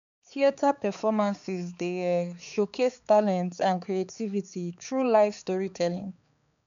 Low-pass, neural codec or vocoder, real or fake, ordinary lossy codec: 7.2 kHz; codec, 16 kHz, 4 kbps, X-Codec, HuBERT features, trained on balanced general audio; fake; none